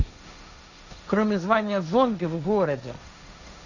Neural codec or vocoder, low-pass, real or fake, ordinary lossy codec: codec, 16 kHz, 1.1 kbps, Voila-Tokenizer; 7.2 kHz; fake; none